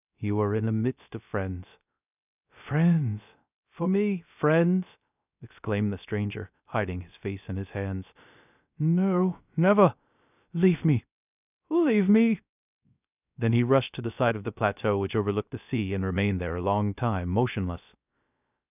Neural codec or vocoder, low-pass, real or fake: codec, 16 kHz, 0.3 kbps, FocalCodec; 3.6 kHz; fake